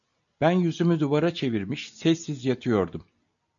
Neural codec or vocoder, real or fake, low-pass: none; real; 7.2 kHz